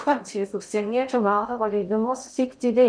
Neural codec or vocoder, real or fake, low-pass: codec, 16 kHz in and 24 kHz out, 0.6 kbps, FocalCodec, streaming, 2048 codes; fake; 9.9 kHz